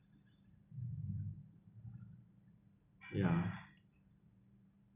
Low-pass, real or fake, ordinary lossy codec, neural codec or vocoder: 3.6 kHz; fake; none; vocoder, 44.1 kHz, 128 mel bands every 512 samples, BigVGAN v2